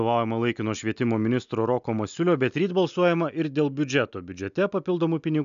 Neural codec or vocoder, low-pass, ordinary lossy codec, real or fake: none; 7.2 kHz; AAC, 96 kbps; real